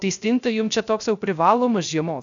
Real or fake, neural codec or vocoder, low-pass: fake; codec, 16 kHz, 0.3 kbps, FocalCodec; 7.2 kHz